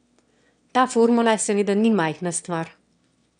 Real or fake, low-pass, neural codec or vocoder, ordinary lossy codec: fake; 9.9 kHz; autoencoder, 22.05 kHz, a latent of 192 numbers a frame, VITS, trained on one speaker; none